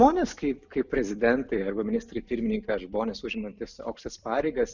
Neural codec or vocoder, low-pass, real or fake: none; 7.2 kHz; real